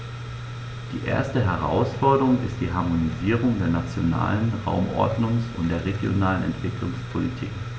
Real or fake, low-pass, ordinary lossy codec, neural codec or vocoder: real; none; none; none